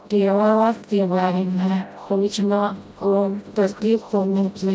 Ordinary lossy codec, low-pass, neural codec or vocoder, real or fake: none; none; codec, 16 kHz, 0.5 kbps, FreqCodec, smaller model; fake